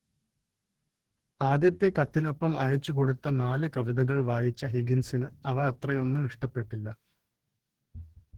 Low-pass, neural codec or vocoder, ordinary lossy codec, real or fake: 19.8 kHz; codec, 44.1 kHz, 2.6 kbps, DAC; Opus, 16 kbps; fake